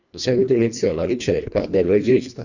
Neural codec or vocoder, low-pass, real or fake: codec, 24 kHz, 1.5 kbps, HILCodec; 7.2 kHz; fake